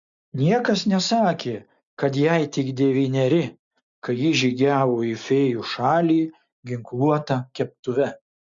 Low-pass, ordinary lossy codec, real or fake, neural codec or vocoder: 7.2 kHz; MP3, 64 kbps; real; none